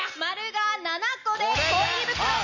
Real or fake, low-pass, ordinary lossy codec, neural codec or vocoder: real; 7.2 kHz; none; none